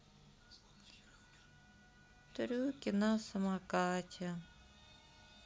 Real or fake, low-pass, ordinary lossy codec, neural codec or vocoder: real; none; none; none